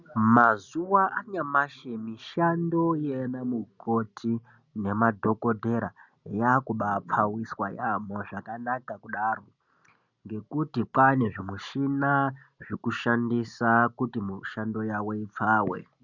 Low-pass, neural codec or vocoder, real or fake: 7.2 kHz; none; real